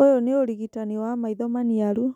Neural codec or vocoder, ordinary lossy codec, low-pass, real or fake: none; none; 19.8 kHz; real